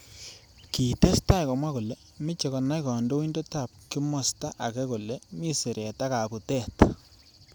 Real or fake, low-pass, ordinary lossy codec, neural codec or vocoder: real; none; none; none